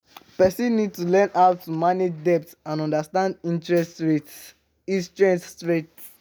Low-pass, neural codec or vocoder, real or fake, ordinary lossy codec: none; none; real; none